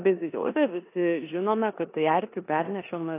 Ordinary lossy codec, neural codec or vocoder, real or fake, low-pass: AAC, 24 kbps; codec, 16 kHz in and 24 kHz out, 0.9 kbps, LongCat-Audio-Codec, four codebook decoder; fake; 3.6 kHz